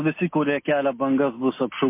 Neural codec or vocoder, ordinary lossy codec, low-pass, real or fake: none; MP3, 24 kbps; 3.6 kHz; real